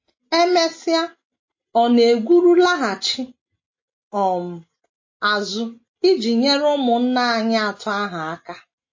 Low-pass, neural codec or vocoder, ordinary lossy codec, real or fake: 7.2 kHz; none; MP3, 32 kbps; real